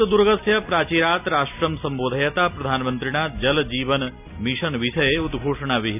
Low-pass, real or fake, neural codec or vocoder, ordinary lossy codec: 3.6 kHz; real; none; none